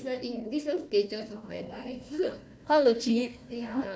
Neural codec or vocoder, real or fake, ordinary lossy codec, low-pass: codec, 16 kHz, 1 kbps, FunCodec, trained on Chinese and English, 50 frames a second; fake; none; none